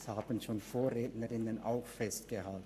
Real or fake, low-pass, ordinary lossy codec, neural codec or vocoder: fake; 14.4 kHz; none; codec, 44.1 kHz, 7.8 kbps, Pupu-Codec